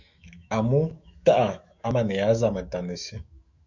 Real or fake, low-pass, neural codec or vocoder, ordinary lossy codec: fake; 7.2 kHz; autoencoder, 48 kHz, 128 numbers a frame, DAC-VAE, trained on Japanese speech; Opus, 64 kbps